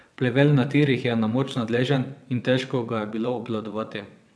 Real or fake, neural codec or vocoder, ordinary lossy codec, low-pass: fake; vocoder, 22.05 kHz, 80 mel bands, WaveNeXt; none; none